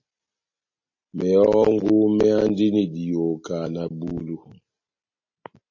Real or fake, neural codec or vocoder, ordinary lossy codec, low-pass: real; none; MP3, 32 kbps; 7.2 kHz